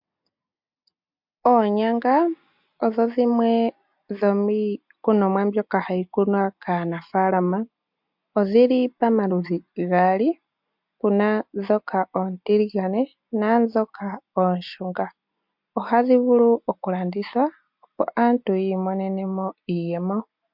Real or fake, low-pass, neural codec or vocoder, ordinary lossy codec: real; 5.4 kHz; none; MP3, 48 kbps